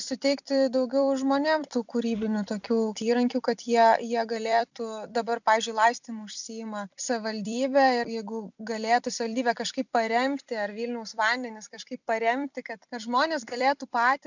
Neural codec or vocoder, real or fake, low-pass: vocoder, 44.1 kHz, 128 mel bands every 256 samples, BigVGAN v2; fake; 7.2 kHz